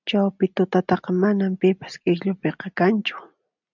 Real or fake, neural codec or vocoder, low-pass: real; none; 7.2 kHz